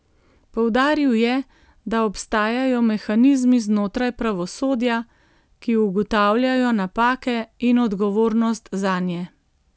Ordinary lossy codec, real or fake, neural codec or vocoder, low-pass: none; real; none; none